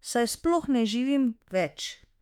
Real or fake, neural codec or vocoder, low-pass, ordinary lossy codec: fake; autoencoder, 48 kHz, 32 numbers a frame, DAC-VAE, trained on Japanese speech; 19.8 kHz; none